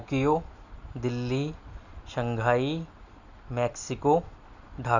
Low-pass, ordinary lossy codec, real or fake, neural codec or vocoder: 7.2 kHz; none; real; none